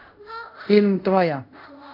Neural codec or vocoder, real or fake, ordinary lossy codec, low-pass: codec, 24 kHz, 0.5 kbps, DualCodec; fake; none; 5.4 kHz